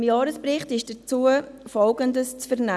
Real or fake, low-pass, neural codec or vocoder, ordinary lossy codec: real; none; none; none